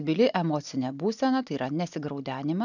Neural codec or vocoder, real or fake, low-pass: none; real; 7.2 kHz